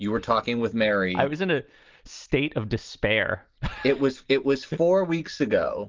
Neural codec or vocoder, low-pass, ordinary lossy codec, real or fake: none; 7.2 kHz; Opus, 32 kbps; real